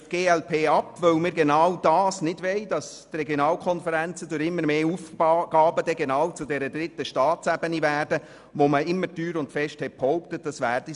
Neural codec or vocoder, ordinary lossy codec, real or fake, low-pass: none; none; real; 10.8 kHz